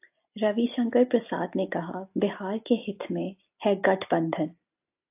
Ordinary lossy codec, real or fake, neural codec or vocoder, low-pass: AAC, 32 kbps; real; none; 3.6 kHz